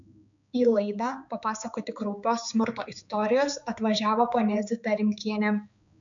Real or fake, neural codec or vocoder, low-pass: fake; codec, 16 kHz, 4 kbps, X-Codec, HuBERT features, trained on balanced general audio; 7.2 kHz